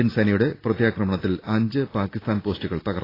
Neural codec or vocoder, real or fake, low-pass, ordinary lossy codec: none; real; 5.4 kHz; AAC, 24 kbps